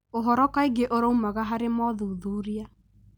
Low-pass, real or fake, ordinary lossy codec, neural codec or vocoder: none; real; none; none